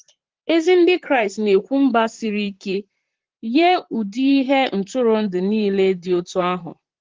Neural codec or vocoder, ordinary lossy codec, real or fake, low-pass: vocoder, 44.1 kHz, 128 mel bands, Pupu-Vocoder; Opus, 16 kbps; fake; 7.2 kHz